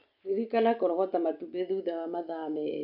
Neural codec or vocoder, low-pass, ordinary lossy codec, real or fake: none; 5.4 kHz; none; real